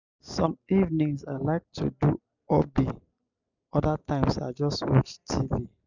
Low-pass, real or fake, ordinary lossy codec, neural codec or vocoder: 7.2 kHz; real; none; none